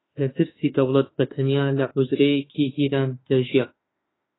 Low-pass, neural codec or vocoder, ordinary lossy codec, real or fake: 7.2 kHz; autoencoder, 48 kHz, 32 numbers a frame, DAC-VAE, trained on Japanese speech; AAC, 16 kbps; fake